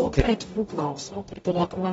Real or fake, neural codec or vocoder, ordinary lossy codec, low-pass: fake; codec, 44.1 kHz, 0.9 kbps, DAC; AAC, 24 kbps; 19.8 kHz